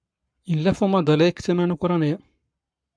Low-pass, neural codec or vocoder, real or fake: 9.9 kHz; codec, 44.1 kHz, 7.8 kbps, Pupu-Codec; fake